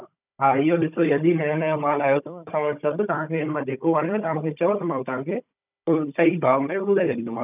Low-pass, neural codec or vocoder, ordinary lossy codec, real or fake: 3.6 kHz; codec, 16 kHz, 16 kbps, FunCodec, trained on Chinese and English, 50 frames a second; none; fake